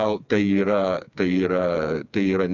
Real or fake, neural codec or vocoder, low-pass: fake; codec, 16 kHz, 4 kbps, FreqCodec, smaller model; 7.2 kHz